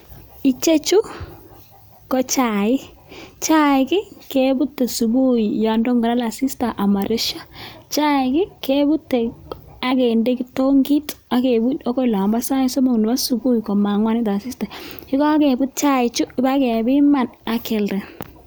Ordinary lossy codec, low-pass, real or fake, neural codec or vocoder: none; none; real; none